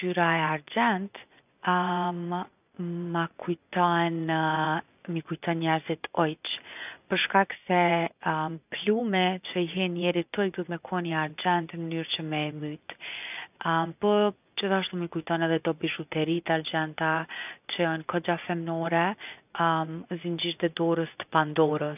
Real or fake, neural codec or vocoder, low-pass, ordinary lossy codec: fake; vocoder, 24 kHz, 100 mel bands, Vocos; 3.6 kHz; none